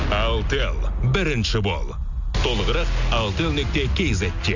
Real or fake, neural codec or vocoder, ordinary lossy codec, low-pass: real; none; none; 7.2 kHz